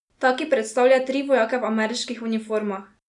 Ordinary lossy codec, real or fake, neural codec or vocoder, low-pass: none; real; none; 10.8 kHz